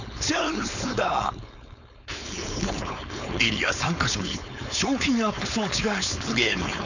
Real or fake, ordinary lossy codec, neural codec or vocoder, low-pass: fake; none; codec, 16 kHz, 4.8 kbps, FACodec; 7.2 kHz